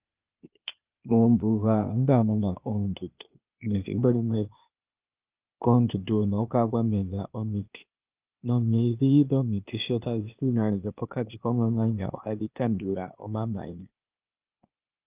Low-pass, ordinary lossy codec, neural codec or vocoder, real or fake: 3.6 kHz; Opus, 24 kbps; codec, 16 kHz, 0.8 kbps, ZipCodec; fake